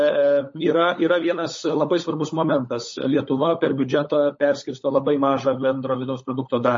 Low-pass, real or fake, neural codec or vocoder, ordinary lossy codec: 7.2 kHz; fake; codec, 16 kHz, 16 kbps, FunCodec, trained on LibriTTS, 50 frames a second; MP3, 32 kbps